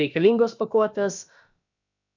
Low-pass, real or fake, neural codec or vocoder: 7.2 kHz; fake; codec, 16 kHz, about 1 kbps, DyCAST, with the encoder's durations